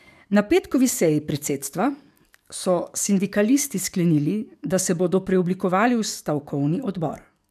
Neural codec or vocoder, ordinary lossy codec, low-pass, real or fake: codec, 44.1 kHz, 7.8 kbps, DAC; none; 14.4 kHz; fake